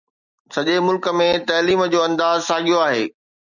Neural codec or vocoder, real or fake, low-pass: none; real; 7.2 kHz